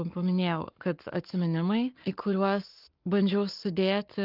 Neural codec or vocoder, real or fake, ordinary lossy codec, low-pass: codec, 44.1 kHz, 7.8 kbps, Pupu-Codec; fake; Opus, 32 kbps; 5.4 kHz